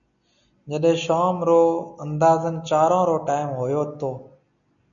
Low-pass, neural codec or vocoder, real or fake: 7.2 kHz; none; real